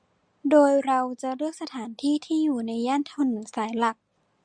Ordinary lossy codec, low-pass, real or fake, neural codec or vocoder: Opus, 64 kbps; 9.9 kHz; real; none